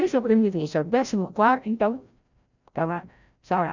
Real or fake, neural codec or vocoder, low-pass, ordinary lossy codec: fake; codec, 16 kHz, 0.5 kbps, FreqCodec, larger model; 7.2 kHz; none